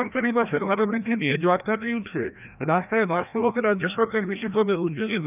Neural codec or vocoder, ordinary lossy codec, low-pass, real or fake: codec, 16 kHz, 1 kbps, FreqCodec, larger model; none; 3.6 kHz; fake